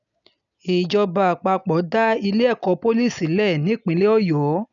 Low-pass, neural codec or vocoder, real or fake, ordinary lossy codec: 7.2 kHz; none; real; none